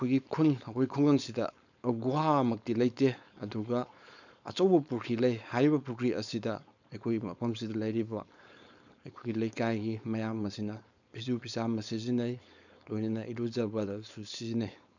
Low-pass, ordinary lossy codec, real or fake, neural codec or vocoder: 7.2 kHz; none; fake; codec, 16 kHz, 4.8 kbps, FACodec